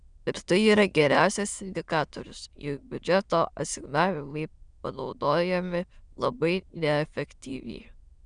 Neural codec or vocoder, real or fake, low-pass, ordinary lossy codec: autoencoder, 22.05 kHz, a latent of 192 numbers a frame, VITS, trained on many speakers; fake; 9.9 kHz; Opus, 64 kbps